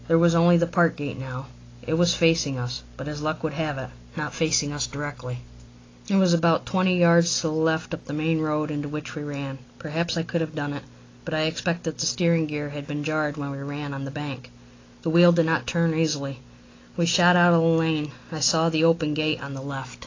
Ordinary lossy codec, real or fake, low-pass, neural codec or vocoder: AAC, 32 kbps; real; 7.2 kHz; none